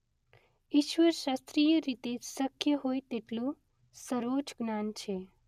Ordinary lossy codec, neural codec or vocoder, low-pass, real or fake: none; none; 14.4 kHz; real